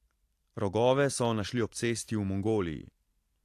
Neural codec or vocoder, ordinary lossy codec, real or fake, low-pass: vocoder, 44.1 kHz, 128 mel bands every 512 samples, BigVGAN v2; AAC, 64 kbps; fake; 14.4 kHz